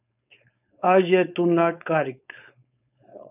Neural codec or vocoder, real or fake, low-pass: codec, 16 kHz, 4.8 kbps, FACodec; fake; 3.6 kHz